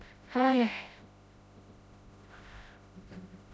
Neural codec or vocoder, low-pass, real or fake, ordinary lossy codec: codec, 16 kHz, 0.5 kbps, FreqCodec, smaller model; none; fake; none